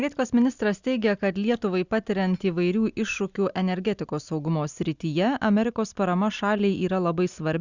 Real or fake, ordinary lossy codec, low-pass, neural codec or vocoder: real; Opus, 64 kbps; 7.2 kHz; none